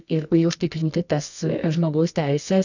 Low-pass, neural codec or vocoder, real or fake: 7.2 kHz; codec, 24 kHz, 0.9 kbps, WavTokenizer, medium music audio release; fake